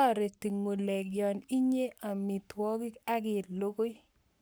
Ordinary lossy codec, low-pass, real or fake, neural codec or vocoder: none; none; fake; codec, 44.1 kHz, 7.8 kbps, Pupu-Codec